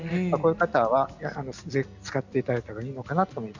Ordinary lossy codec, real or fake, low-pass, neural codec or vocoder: none; real; 7.2 kHz; none